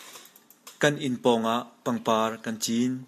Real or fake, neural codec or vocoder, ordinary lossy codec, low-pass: real; none; AAC, 64 kbps; 14.4 kHz